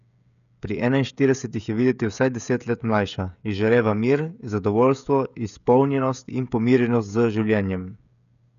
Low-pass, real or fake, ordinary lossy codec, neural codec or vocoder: 7.2 kHz; fake; none; codec, 16 kHz, 16 kbps, FreqCodec, smaller model